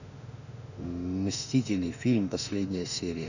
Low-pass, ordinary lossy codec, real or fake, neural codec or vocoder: 7.2 kHz; none; fake; autoencoder, 48 kHz, 32 numbers a frame, DAC-VAE, trained on Japanese speech